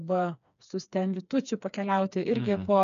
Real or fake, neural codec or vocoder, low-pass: fake; codec, 16 kHz, 4 kbps, FreqCodec, smaller model; 7.2 kHz